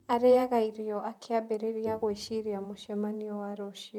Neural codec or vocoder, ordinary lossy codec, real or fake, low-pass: vocoder, 44.1 kHz, 128 mel bands, Pupu-Vocoder; none; fake; 19.8 kHz